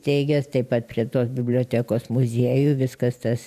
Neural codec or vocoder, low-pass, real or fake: vocoder, 44.1 kHz, 128 mel bands every 512 samples, BigVGAN v2; 14.4 kHz; fake